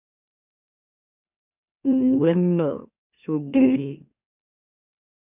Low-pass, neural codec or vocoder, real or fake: 3.6 kHz; autoencoder, 44.1 kHz, a latent of 192 numbers a frame, MeloTTS; fake